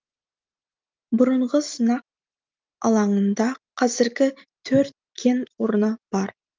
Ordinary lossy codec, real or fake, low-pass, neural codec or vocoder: Opus, 24 kbps; real; 7.2 kHz; none